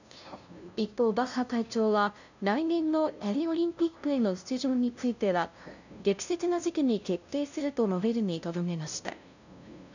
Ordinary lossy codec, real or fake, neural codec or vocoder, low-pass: none; fake; codec, 16 kHz, 0.5 kbps, FunCodec, trained on LibriTTS, 25 frames a second; 7.2 kHz